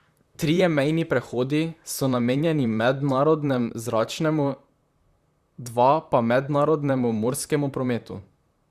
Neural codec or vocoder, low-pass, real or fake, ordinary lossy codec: vocoder, 44.1 kHz, 128 mel bands, Pupu-Vocoder; 14.4 kHz; fake; Opus, 64 kbps